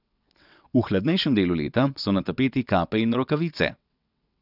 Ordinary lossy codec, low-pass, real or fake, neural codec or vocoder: none; 5.4 kHz; fake; vocoder, 22.05 kHz, 80 mel bands, WaveNeXt